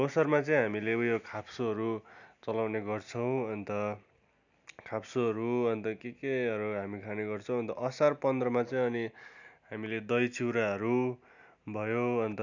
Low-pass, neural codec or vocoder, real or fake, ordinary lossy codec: 7.2 kHz; none; real; none